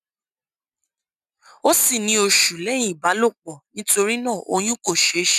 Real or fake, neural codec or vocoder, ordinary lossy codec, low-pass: real; none; none; 14.4 kHz